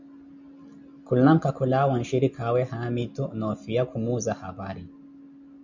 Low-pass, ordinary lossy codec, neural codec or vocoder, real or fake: 7.2 kHz; MP3, 64 kbps; none; real